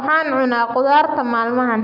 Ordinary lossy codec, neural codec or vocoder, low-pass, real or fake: none; none; 5.4 kHz; real